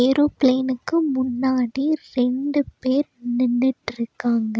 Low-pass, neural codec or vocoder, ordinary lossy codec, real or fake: none; none; none; real